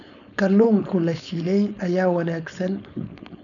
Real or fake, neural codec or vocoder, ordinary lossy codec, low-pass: fake; codec, 16 kHz, 4.8 kbps, FACodec; none; 7.2 kHz